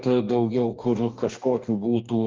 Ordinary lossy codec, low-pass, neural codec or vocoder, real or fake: Opus, 32 kbps; 7.2 kHz; codec, 44.1 kHz, 2.6 kbps, DAC; fake